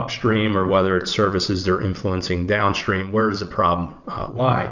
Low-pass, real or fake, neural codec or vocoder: 7.2 kHz; fake; vocoder, 22.05 kHz, 80 mel bands, WaveNeXt